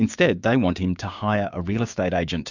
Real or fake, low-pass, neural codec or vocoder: fake; 7.2 kHz; codec, 16 kHz, 6 kbps, DAC